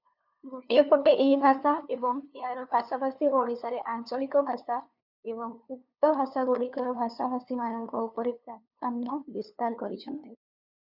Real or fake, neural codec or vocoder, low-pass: fake; codec, 16 kHz, 2 kbps, FunCodec, trained on LibriTTS, 25 frames a second; 5.4 kHz